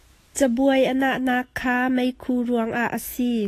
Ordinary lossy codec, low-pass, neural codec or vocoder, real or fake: AAC, 48 kbps; 14.4 kHz; autoencoder, 48 kHz, 128 numbers a frame, DAC-VAE, trained on Japanese speech; fake